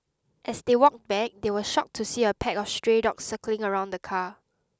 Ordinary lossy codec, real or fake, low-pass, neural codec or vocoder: none; real; none; none